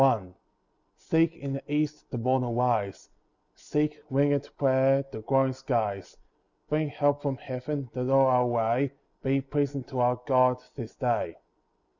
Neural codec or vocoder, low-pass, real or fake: none; 7.2 kHz; real